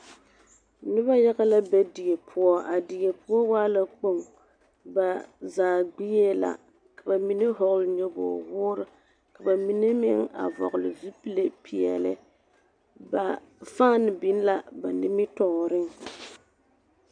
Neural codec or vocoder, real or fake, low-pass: none; real; 9.9 kHz